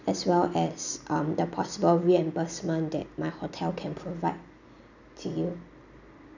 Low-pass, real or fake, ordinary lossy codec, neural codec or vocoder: 7.2 kHz; real; none; none